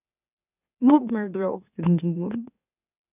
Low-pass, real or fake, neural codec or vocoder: 3.6 kHz; fake; autoencoder, 44.1 kHz, a latent of 192 numbers a frame, MeloTTS